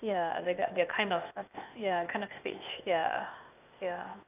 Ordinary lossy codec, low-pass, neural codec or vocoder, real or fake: none; 3.6 kHz; codec, 16 kHz, 0.8 kbps, ZipCodec; fake